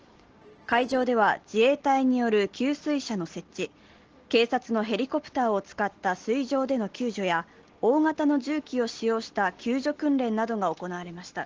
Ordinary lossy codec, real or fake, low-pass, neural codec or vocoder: Opus, 16 kbps; real; 7.2 kHz; none